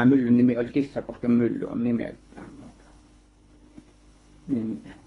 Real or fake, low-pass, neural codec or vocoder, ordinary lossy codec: fake; 10.8 kHz; codec, 24 kHz, 3 kbps, HILCodec; AAC, 32 kbps